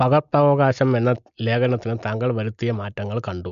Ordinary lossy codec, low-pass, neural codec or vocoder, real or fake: MP3, 64 kbps; 7.2 kHz; none; real